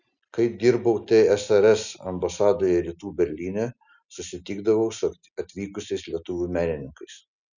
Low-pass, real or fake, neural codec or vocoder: 7.2 kHz; real; none